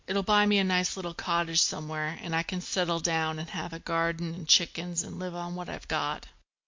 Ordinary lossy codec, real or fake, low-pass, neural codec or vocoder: MP3, 48 kbps; real; 7.2 kHz; none